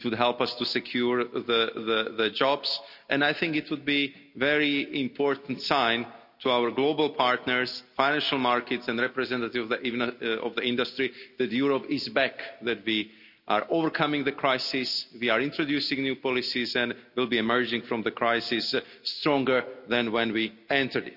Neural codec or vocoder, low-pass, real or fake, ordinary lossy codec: none; 5.4 kHz; real; none